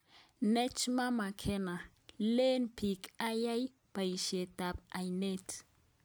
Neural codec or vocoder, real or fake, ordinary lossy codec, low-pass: none; real; none; none